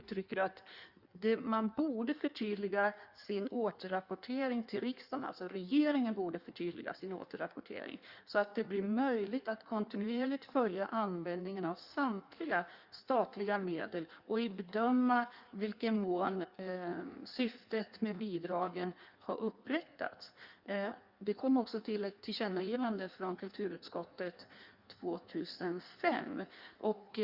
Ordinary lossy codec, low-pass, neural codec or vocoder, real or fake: Opus, 64 kbps; 5.4 kHz; codec, 16 kHz in and 24 kHz out, 1.1 kbps, FireRedTTS-2 codec; fake